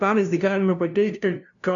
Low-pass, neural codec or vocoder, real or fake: 7.2 kHz; codec, 16 kHz, 0.5 kbps, FunCodec, trained on LibriTTS, 25 frames a second; fake